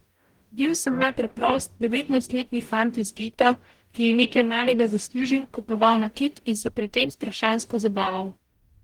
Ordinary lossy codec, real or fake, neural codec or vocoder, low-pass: Opus, 24 kbps; fake; codec, 44.1 kHz, 0.9 kbps, DAC; 19.8 kHz